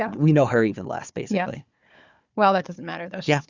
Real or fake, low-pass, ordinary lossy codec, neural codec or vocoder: fake; 7.2 kHz; Opus, 64 kbps; codec, 16 kHz, 4 kbps, FunCodec, trained on Chinese and English, 50 frames a second